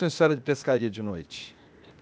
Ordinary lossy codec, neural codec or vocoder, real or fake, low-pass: none; codec, 16 kHz, 0.8 kbps, ZipCodec; fake; none